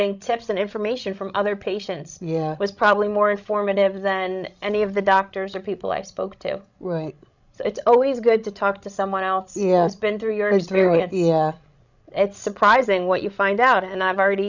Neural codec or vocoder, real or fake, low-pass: codec, 16 kHz, 16 kbps, FreqCodec, larger model; fake; 7.2 kHz